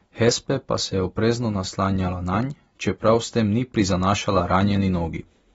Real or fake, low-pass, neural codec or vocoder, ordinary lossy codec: real; 14.4 kHz; none; AAC, 24 kbps